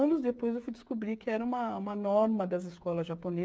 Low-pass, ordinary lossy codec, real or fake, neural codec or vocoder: none; none; fake; codec, 16 kHz, 8 kbps, FreqCodec, smaller model